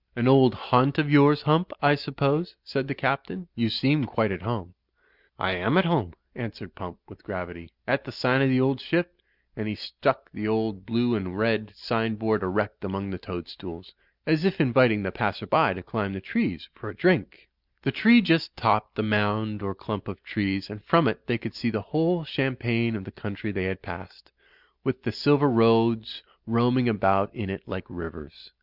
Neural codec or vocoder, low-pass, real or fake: none; 5.4 kHz; real